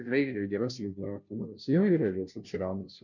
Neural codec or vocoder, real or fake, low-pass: codec, 16 kHz, 0.5 kbps, FunCodec, trained on Chinese and English, 25 frames a second; fake; 7.2 kHz